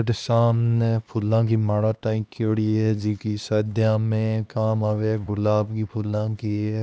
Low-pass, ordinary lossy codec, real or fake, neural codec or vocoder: none; none; fake; codec, 16 kHz, 2 kbps, X-Codec, HuBERT features, trained on LibriSpeech